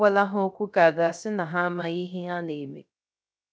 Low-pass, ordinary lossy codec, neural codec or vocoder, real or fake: none; none; codec, 16 kHz, 0.3 kbps, FocalCodec; fake